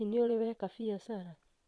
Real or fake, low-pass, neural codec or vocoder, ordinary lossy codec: fake; none; vocoder, 22.05 kHz, 80 mel bands, Vocos; none